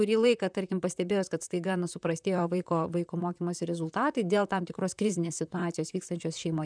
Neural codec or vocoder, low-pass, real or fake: vocoder, 44.1 kHz, 128 mel bands, Pupu-Vocoder; 9.9 kHz; fake